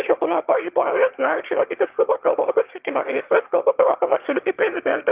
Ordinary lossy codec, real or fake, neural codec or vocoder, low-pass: Opus, 32 kbps; fake; autoencoder, 22.05 kHz, a latent of 192 numbers a frame, VITS, trained on one speaker; 3.6 kHz